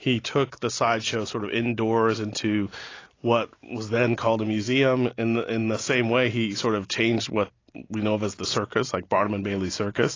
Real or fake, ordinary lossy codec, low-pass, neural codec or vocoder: real; AAC, 32 kbps; 7.2 kHz; none